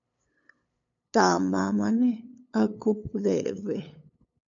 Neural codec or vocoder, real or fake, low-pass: codec, 16 kHz, 8 kbps, FunCodec, trained on LibriTTS, 25 frames a second; fake; 7.2 kHz